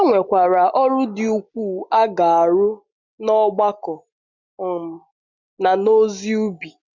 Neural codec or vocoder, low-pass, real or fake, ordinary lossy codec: none; 7.2 kHz; real; none